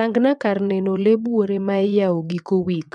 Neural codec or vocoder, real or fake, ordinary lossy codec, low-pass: vocoder, 22.05 kHz, 80 mel bands, WaveNeXt; fake; none; 9.9 kHz